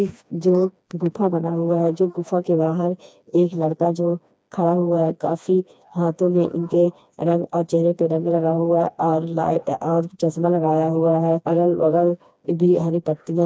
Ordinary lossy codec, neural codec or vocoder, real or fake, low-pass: none; codec, 16 kHz, 2 kbps, FreqCodec, smaller model; fake; none